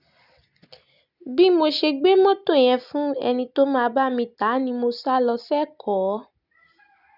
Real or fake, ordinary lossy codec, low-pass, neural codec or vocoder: real; none; 5.4 kHz; none